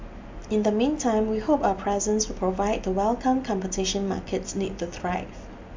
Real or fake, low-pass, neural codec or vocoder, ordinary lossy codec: real; 7.2 kHz; none; MP3, 64 kbps